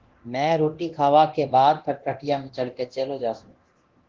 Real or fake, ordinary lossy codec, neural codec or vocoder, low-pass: fake; Opus, 16 kbps; codec, 24 kHz, 0.9 kbps, DualCodec; 7.2 kHz